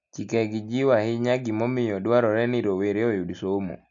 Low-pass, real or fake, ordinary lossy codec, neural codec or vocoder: 7.2 kHz; real; AAC, 64 kbps; none